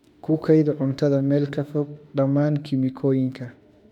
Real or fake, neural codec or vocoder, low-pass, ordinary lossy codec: fake; autoencoder, 48 kHz, 32 numbers a frame, DAC-VAE, trained on Japanese speech; 19.8 kHz; none